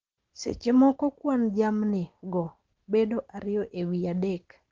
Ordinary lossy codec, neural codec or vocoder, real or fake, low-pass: Opus, 16 kbps; none; real; 7.2 kHz